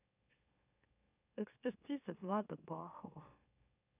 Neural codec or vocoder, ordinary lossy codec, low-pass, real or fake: autoencoder, 44.1 kHz, a latent of 192 numbers a frame, MeloTTS; none; 3.6 kHz; fake